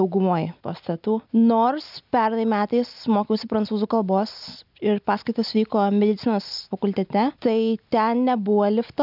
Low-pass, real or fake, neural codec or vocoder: 5.4 kHz; real; none